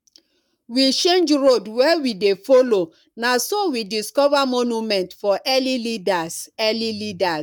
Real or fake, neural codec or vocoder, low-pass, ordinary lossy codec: fake; codec, 44.1 kHz, 7.8 kbps, Pupu-Codec; 19.8 kHz; none